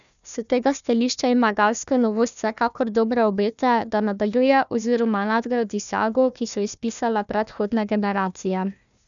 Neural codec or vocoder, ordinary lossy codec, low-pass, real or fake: codec, 16 kHz, 1 kbps, FunCodec, trained on Chinese and English, 50 frames a second; none; 7.2 kHz; fake